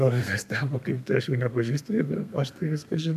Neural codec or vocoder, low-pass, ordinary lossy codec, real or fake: codec, 32 kHz, 1.9 kbps, SNAC; 14.4 kHz; MP3, 96 kbps; fake